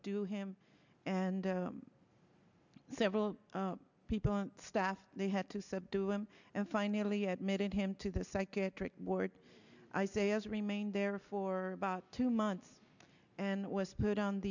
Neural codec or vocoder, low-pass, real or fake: none; 7.2 kHz; real